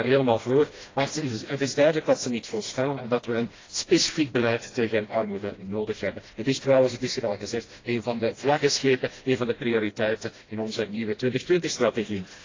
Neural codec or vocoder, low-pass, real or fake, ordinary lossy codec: codec, 16 kHz, 1 kbps, FreqCodec, smaller model; 7.2 kHz; fake; AAC, 32 kbps